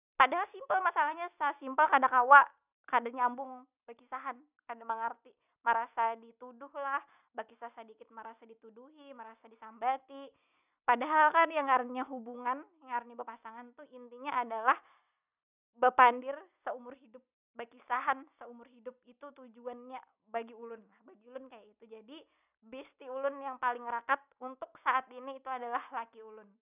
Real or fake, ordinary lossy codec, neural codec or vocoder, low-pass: real; none; none; 3.6 kHz